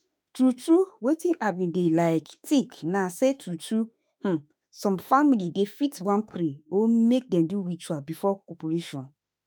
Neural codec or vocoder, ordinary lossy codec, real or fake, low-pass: autoencoder, 48 kHz, 32 numbers a frame, DAC-VAE, trained on Japanese speech; none; fake; none